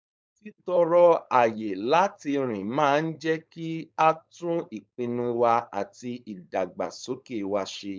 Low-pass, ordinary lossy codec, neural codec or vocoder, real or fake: none; none; codec, 16 kHz, 4.8 kbps, FACodec; fake